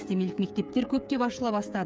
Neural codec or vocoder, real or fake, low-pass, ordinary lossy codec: codec, 16 kHz, 8 kbps, FreqCodec, smaller model; fake; none; none